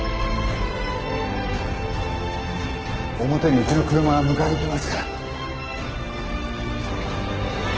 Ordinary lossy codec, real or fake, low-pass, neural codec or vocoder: Opus, 16 kbps; real; 7.2 kHz; none